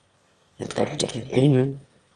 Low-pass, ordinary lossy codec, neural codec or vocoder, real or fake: 9.9 kHz; Opus, 32 kbps; autoencoder, 22.05 kHz, a latent of 192 numbers a frame, VITS, trained on one speaker; fake